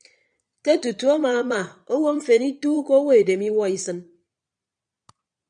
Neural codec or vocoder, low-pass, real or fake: vocoder, 22.05 kHz, 80 mel bands, Vocos; 9.9 kHz; fake